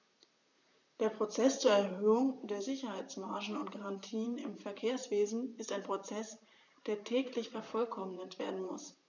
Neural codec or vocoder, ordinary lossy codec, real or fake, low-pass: vocoder, 44.1 kHz, 128 mel bands, Pupu-Vocoder; none; fake; 7.2 kHz